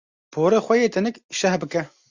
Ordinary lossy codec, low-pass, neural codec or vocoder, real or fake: Opus, 64 kbps; 7.2 kHz; none; real